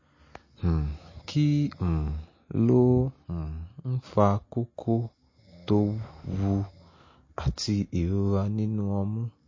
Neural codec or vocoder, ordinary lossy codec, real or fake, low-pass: none; MP3, 32 kbps; real; 7.2 kHz